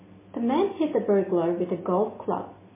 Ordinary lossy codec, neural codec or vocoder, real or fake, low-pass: MP3, 16 kbps; none; real; 3.6 kHz